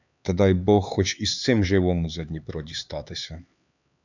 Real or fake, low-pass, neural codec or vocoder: fake; 7.2 kHz; codec, 16 kHz, 4 kbps, X-Codec, HuBERT features, trained on LibriSpeech